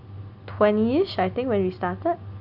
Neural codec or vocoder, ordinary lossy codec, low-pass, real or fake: none; none; 5.4 kHz; real